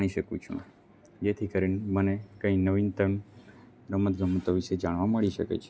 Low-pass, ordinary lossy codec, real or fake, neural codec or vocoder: none; none; real; none